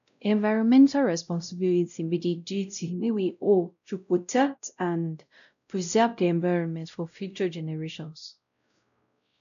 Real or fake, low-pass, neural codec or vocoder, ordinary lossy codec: fake; 7.2 kHz; codec, 16 kHz, 0.5 kbps, X-Codec, WavLM features, trained on Multilingual LibriSpeech; MP3, 96 kbps